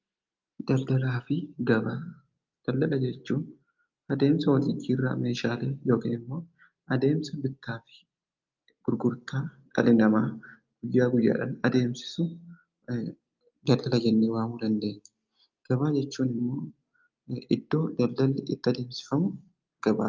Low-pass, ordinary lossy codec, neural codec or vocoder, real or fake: 7.2 kHz; Opus, 24 kbps; none; real